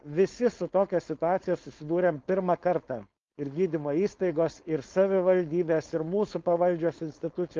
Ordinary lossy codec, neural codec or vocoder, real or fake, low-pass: Opus, 32 kbps; codec, 16 kHz, 4.8 kbps, FACodec; fake; 7.2 kHz